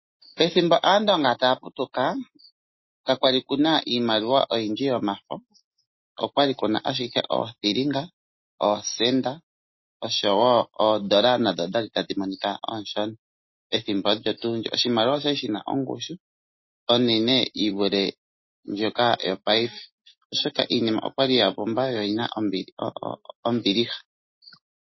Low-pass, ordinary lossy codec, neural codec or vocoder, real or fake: 7.2 kHz; MP3, 24 kbps; none; real